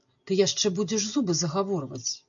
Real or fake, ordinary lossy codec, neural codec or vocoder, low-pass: real; MP3, 96 kbps; none; 7.2 kHz